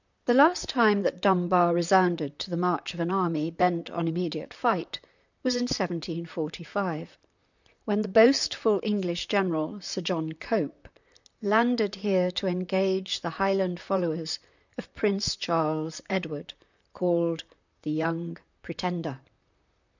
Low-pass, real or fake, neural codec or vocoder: 7.2 kHz; fake; vocoder, 44.1 kHz, 128 mel bands, Pupu-Vocoder